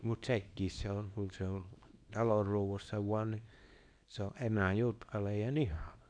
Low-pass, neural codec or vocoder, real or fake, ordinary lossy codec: 9.9 kHz; codec, 24 kHz, 0.9 kbps, WavTokenizer, small release; fake; none